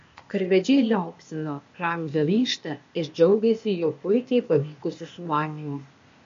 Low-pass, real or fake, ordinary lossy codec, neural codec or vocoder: 7.2 kHz; fake; MP3, 48 kbps; codec, 16 kHz, 0.8 kbps, ZipCodec